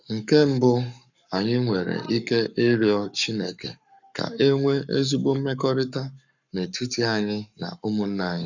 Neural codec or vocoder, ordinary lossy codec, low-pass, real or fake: codec, 44.1 kHz, 7.8 kbps, Pupu-Codec; none; 7.2 kHz; fake